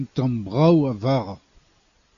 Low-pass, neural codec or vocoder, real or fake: 7.2 kHz; none; real